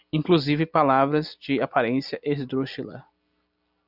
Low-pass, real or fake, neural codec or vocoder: 5.4 kHz; real; none